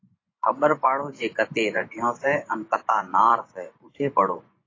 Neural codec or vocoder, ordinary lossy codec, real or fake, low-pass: vocoder, 24 kHz, 100 mel bands, Vocos; AAC, 32 kbps; fake; 7.2 kHz